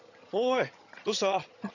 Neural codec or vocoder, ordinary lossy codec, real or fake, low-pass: vocoder, 22.05 kHz, 80 mel bands, HiFi-GAN; none; fake; 7.2 kHz